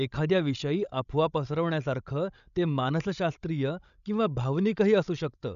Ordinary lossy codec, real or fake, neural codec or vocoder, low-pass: none; fake; codec, 16 kHz, 16 kbps, FreqCodec, larger model; 7.2 kHz